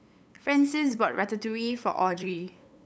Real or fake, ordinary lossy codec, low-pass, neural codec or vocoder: fake; none; none; codec, 16 kHz, 8 kbps, FunCodec, trained on LibriTTS, 25 frames a second